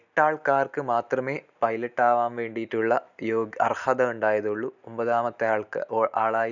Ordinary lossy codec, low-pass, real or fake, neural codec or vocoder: none; 7.2 kHz; real; none